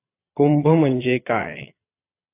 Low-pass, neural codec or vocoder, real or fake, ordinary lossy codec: 3.6 kHz; vocoder, 22.05 kHz, 80 mel bands, Vocos; fake; AAC, 24 kbps